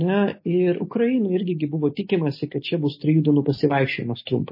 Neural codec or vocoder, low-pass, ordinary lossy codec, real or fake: none; 5.4 kHz; MP3, 24 kbps; real